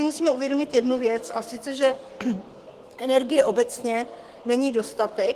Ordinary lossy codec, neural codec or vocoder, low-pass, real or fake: Opus, 24 kbps; codec, 32 kHz, 1.9 kbps, SNAC; 14.4 kHz; fake